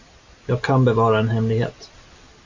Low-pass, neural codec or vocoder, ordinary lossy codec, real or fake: 7.2 kHz; none; AAC, 48 kbps; real